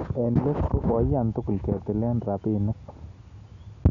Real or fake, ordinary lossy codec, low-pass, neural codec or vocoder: real; none; 7.2 kHz; none